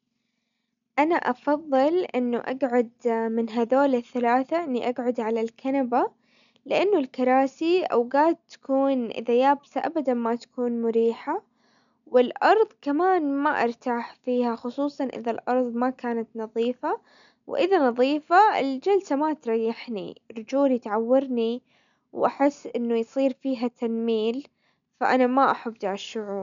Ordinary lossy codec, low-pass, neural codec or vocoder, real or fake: none; 7.2 kHz; none; real